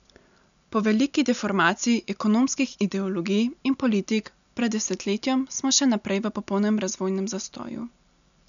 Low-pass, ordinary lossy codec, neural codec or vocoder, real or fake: 7.2 kHz; none; none; real